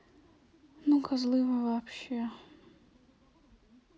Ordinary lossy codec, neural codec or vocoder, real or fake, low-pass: none; none; real; none